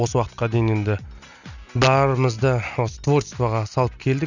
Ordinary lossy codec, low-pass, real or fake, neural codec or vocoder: none; 7.2 kHz; real; none